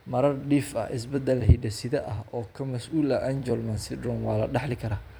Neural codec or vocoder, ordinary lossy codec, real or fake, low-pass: vocoder, 44.1 kHz, 128 mel bands every 256 samples, BigVGAN v2; none; fake; none